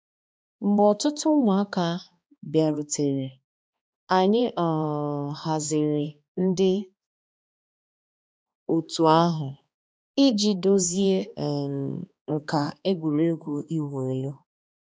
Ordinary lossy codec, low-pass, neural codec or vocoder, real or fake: none; none; codec, 16 kHz, 2 kbps, X-Codec, HuBERT features, trained on balanced general audio; fake